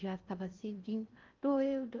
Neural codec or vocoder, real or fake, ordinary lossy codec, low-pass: codec, 16 kHz in and 24 kHz out, 0.8 kbps, FocalCodec, streaming, 65536 codes; fake; Opus, 32 kbps; 7.2 kHz